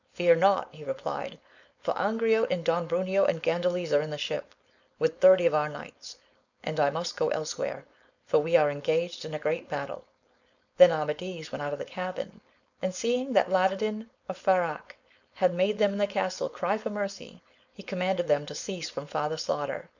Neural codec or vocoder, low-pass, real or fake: codec, 16 kHz, 4.8 kbps, FACodec; 7.2 kHz; fake